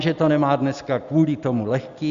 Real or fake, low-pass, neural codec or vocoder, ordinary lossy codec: real; 7.2 kHz; none; Opus, 64 kbps